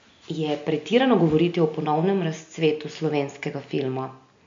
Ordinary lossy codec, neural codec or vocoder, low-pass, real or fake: MP3, 48 kbps; none; 7.2 kHz; real